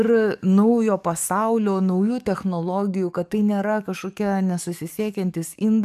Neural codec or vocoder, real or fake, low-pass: codec, 44.1 kHz, 7.8 kbps, DAC; fake; 14.4 kHz